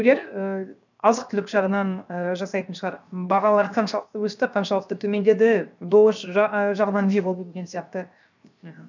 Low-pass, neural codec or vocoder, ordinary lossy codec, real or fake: 7.2 kHz; codec, 16 kHz, 0.7 kbps, FocalCodec; none; fake